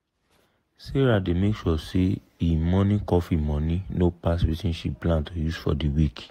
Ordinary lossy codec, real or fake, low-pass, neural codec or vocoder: AAC, 48 kbps; real; 19.8 kHz; none